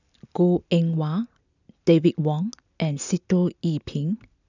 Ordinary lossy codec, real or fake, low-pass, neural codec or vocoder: none; real; 7.2 kHz; none